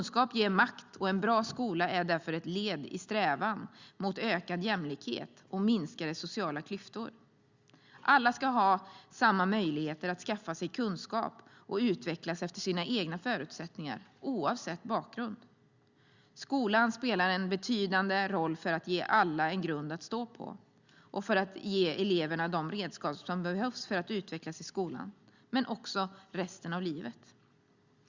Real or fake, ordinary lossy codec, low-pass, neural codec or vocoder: real; Opus, 64 kbps; 7.2 kHz; none